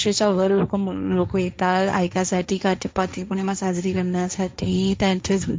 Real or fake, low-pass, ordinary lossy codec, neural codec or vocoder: fake; none; none; codec, 16 kHz, 1.1 kbps, Voila-Tokenizer